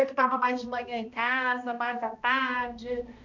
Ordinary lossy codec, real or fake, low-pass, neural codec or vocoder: none; fake; 7.2 kHz; codec, 16 kHz, 1 kbps, X-Codec, HuBERT features, trained on balanced general audio